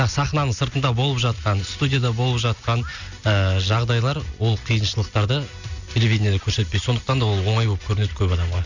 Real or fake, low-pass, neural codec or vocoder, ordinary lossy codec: real; 7.2 kHz; none; none